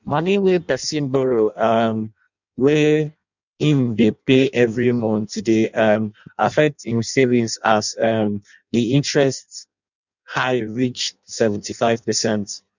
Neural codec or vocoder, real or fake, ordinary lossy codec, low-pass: codec, 16 kHz in and 24 kHz out, 0.6 kbps, FireRedTTS-2 codec; fake; none; 7.2 kHz